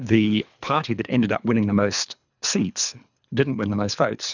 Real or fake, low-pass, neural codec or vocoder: fake; 7.2 kHz; codec, 24 kHz, 3 kbps, HILCodec